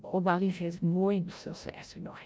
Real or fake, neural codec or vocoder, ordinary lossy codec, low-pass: fake; codec, 16 kHz, 0.5 kbps, FreqCodec, larger model; none; none